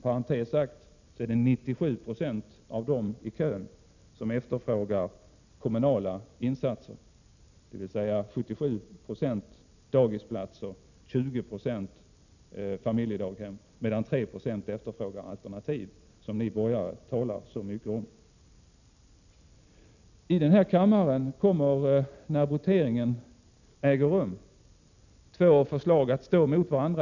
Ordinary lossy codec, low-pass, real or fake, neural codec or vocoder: none; 7.2 kHz; real; none